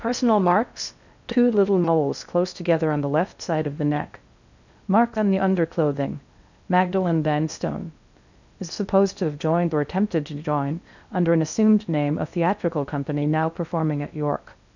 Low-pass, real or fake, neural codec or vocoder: 7.2 kHz; fake; codec, 16 kHz in and 24 kHz out, 0.6 kbps, FocalCodec, streaming, 4096 codes